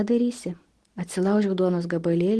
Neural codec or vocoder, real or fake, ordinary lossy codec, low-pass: none; real; Opus, 16 kbps; 10.8 kHz